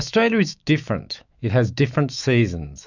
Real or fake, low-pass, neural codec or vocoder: fake; 7.2 kHz; autoencoder, 48 kHz, 128 numbers a frame, DAC-VAE, trained on Japanese speech